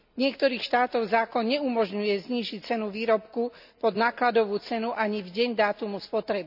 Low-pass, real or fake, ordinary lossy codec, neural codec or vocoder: 5.4 kHz; real; none; none